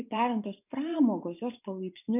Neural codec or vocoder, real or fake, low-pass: vocoder, 24 kHz, 100 mel bands, Vocos; fake; 3.6 kHz